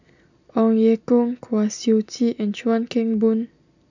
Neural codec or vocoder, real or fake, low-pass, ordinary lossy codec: none; real; 7.2 kHz; none